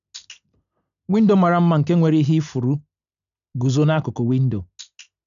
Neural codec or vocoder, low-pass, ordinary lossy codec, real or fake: none; 7.2 kHz; none; real